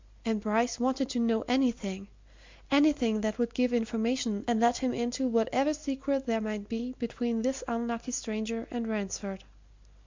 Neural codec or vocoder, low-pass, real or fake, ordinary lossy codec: none; 7.2 kHz; real; MP3, 64 kbps